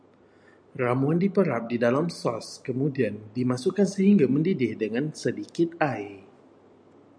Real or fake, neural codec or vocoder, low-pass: real; none; 9.9 kHz